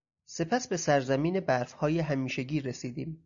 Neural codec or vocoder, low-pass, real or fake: none; 7.2 kHz; real